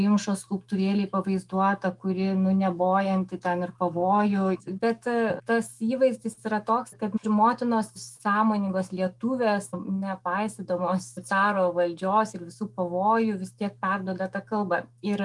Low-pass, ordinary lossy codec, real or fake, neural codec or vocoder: 10.8 kHz; Opus, 32 kbps; fake; autoencoder, 48 kHz, 128 numbers a frame, DAC-VAE, trained on Japanese speech